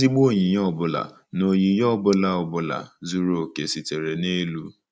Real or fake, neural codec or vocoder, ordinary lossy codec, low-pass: real; none; none; none